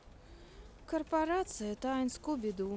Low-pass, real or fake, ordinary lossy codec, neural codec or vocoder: none; real; none; none